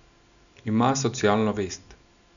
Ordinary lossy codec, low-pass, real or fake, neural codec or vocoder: none; 7.2 kHz; real; none